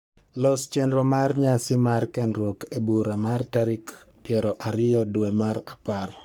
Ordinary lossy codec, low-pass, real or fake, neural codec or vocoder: none; none; fake; codec, 44.1 kHz, 3.4 kbps, Pupu-Codec